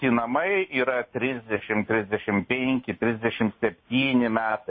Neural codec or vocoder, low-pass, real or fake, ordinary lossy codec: none; 7.2 kHz; real; MP3, 24 kbps